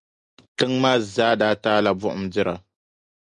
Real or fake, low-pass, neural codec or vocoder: real; 10.8 kHz; none